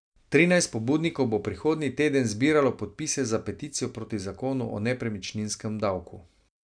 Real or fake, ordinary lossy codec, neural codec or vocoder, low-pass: real; none; none; 9.9 kHz